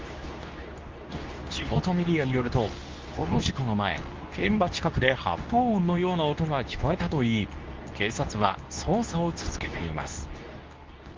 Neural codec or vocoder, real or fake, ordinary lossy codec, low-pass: codec, 24 kHz, 0.9 kbps, WavTokenizer, medium speech release version 2; fake; Opus, 24 kbps; 7.2 kHz